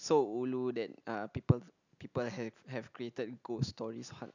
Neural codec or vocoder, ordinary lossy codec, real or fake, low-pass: none; none; real; 7.2 kHz